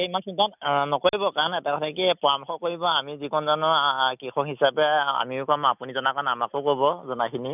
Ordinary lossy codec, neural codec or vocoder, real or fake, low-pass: none; none; real; 3.6 kHz